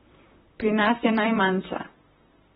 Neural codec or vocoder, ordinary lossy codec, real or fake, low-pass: vocoder, 44.1 kHz, 128 mel bands, Pupu-Vocoder; AAC, 16 kbps; fake; 19.8 kHz